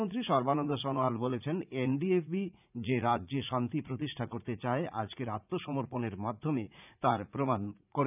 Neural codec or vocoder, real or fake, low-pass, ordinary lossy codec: vocoder, 44.1 kHz, 80 mel bands, Vocos; fake; 3.6 kHz; none